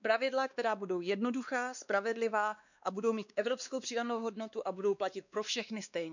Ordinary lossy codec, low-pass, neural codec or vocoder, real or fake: none; 7.2 kHz; codec, 16 kHz, 2 kbps, X-Codec, WavLM features, trained on Multilingual LibriSpeech; fake